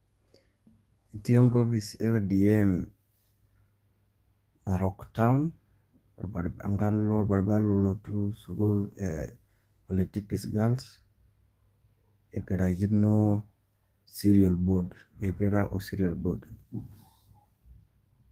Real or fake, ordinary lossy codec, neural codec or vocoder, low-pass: fake; Opus, 32 kbps; codec, 32 kHz, 1.9 kbps, SNAC; 14.4 kHz